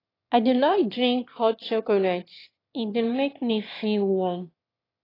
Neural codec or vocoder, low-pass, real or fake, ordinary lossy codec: autoencoder, 22.05 kHz, a latent of 192 numbers a frame, VITS, trained on one speaker; 5.4 kHz; fake; AAC, 24 kbps